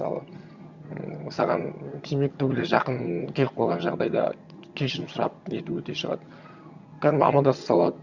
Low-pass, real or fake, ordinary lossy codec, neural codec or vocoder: 7.2 kHz; fake; Opus, 64 kbps; vocoder, 22.05 kHz, 80 mel bands, HiFi-GAN